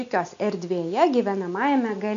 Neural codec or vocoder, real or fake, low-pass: none; real; 7.2 kHz